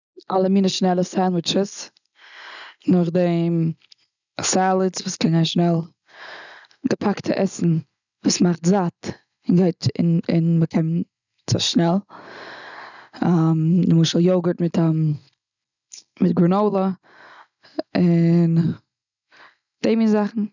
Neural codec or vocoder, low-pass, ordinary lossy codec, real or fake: none; 7.2 kHz; none; real